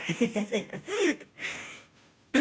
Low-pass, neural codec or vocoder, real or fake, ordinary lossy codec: none; codec, 16 kHz, 0.5 kbps, FunCodec, trained on Chinese and English, 25 frames a second; fake; none